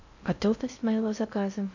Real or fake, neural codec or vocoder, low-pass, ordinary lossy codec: fake; codec, 16 kHz in and 24 kHz out, 0.6 kbps, FocalCodec, streaming, 4096 codes; 7.2 kHz; none